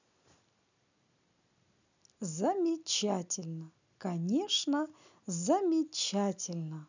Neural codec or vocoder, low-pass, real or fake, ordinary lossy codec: none; 7.2 kHz; real; none